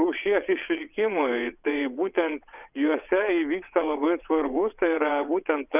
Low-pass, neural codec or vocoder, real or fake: 3.6 kHz; vocoder, 22.05 kHz, 80 mel bands, WaveNeXt; fake